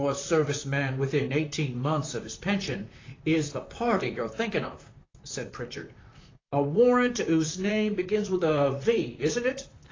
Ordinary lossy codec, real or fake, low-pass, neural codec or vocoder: AAC, 32 kbps; fake; 7.2 kHz; vocoder, 44.1 kHz, 128 mel bands, Pupu-Vocoder